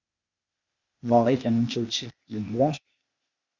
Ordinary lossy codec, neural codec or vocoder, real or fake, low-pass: Opus, 64 kbps; codec, 16 kHz, 0.8 kbps, ZipCodec; fake; 7.2 kHz